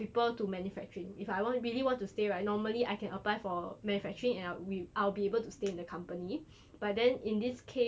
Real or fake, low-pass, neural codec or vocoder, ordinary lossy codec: real; none; none; none